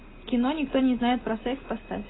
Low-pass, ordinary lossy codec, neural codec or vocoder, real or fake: 7.2 kHz; AAC, 16 kbps; none; real